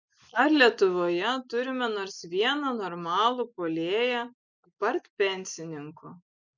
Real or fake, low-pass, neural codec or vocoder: real; 7.2 kHz; none